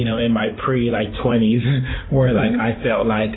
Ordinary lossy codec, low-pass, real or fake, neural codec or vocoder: AAC, 16 kbps; 7.2 kHz; fake; codec, 16 kHz in and 24 kHz out, 2.2 kbps, FireRedTTS-2 codec